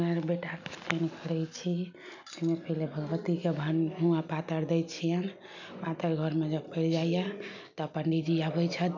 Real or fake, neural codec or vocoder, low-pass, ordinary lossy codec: real; none; 7.2 kHz; none